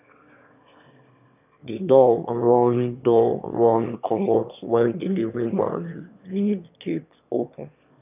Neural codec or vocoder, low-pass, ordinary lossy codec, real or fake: autoencoder, 22.05 kHz, a latent of 192 numbers a frame, VITS, trained on one speaker; 3.6 kHz; none; fake